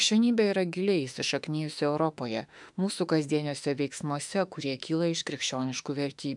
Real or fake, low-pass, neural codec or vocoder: fake; 10.8 kHz; autoencoder, 48 kHz, 32 numbers a frame, DAC-VAE, trained on Japanese speech